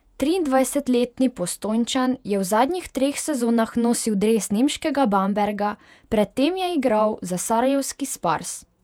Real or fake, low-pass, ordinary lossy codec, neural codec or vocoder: fake; 19.8 kHz; none; vocoder, 48 kHz, 128 mel bands, Vocos